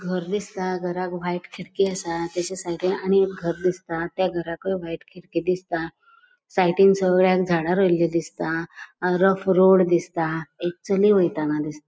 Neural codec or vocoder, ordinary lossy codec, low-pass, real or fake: none; none; none; real